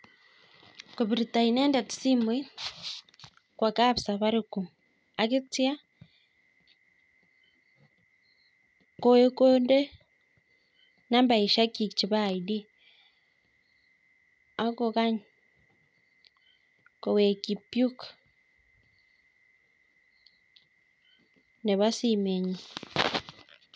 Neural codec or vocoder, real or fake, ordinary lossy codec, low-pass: none; real; none; none